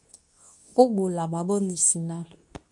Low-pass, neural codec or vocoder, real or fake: 10.8 kHz; codec, 24 kHz, 0.9 kbps, WavTokenizer, medium speech release version 2; fake